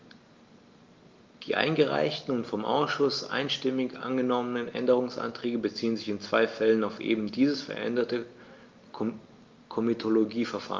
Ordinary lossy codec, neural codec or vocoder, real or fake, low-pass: Opus, 32 kbps; none; real; 7.2 kHz